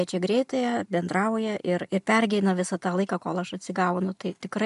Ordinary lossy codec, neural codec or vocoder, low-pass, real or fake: MP3, 96 kbps; none; 10.8 kHz; real